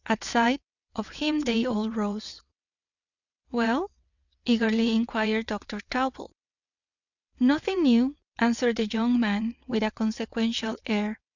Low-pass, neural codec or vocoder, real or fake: 7.2 kHz; vocoder, 22.05 kHz, 80 mel bands, WaveNeXt; fake